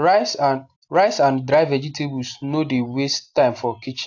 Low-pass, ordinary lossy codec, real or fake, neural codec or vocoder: 7.2 kHz; AAC, 48 kbps; real; none